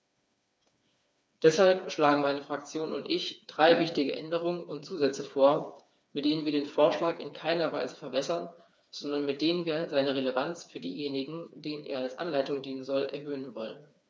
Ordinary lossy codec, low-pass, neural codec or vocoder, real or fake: none; none; codec, 16 kHz, 4 kbps, FreqCodec, smaller model; fake